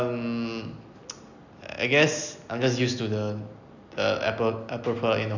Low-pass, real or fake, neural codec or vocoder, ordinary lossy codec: 7.2 kHz; real; none; none